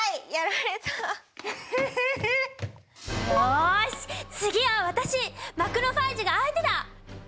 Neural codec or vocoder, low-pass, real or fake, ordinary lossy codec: none; none; real; none